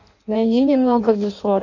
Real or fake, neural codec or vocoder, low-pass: fake; codec, 16 kHz in and 24 kHz out, 0.6 kbps, FireRedTTS-2 codec; 7.2 kHz